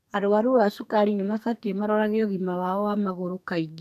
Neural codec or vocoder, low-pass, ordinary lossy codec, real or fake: codec, 44.1 kHz, 2.6 kbps, SNAC; 14.4 kHz; none; fake